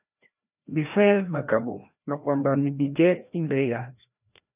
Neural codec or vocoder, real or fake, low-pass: codec, 16 kHz, 1 kbps, FreqCodec, larger model; fake; 3.6 kHz